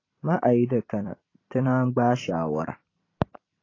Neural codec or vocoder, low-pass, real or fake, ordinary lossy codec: vocoder, 44.1 kHz, 128 mel bands every 256 samples, BigVGAN v2; 7.2 kHz; fake; AAC, 32 kbps